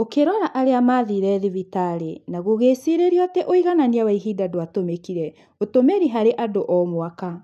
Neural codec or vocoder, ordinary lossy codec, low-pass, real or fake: none; none; 14.4 kHz; real